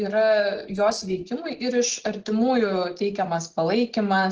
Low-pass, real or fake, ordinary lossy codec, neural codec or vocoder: 7.2 kHz; real; Opus, 16 kbps; none